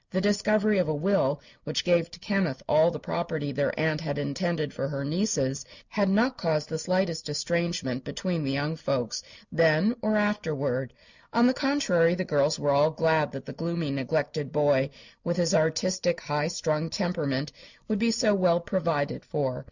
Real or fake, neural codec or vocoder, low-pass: real; none; 7.2 kHz